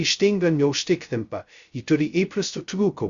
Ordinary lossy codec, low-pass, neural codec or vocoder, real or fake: Opus, 64 kbps; 7.2 kHz; codec, 16 kHz, 0.2 kbps, FocalCodec; fake